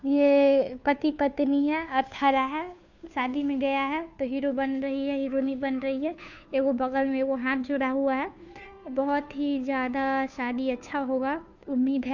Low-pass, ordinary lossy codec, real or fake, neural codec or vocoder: 7.2 kHz; none; fake; codec, 16 kHz, 2 kbps, FunCodec, trained on Chinese and English, 25 frames a second